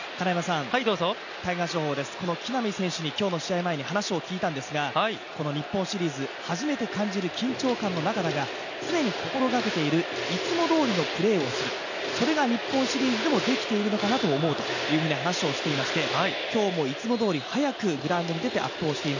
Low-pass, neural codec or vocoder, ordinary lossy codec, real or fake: 7.2 kHz; none; none; real